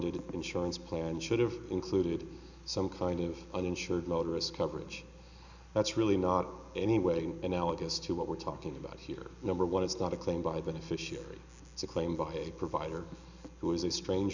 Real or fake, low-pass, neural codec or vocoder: real; 7.2 kHz; none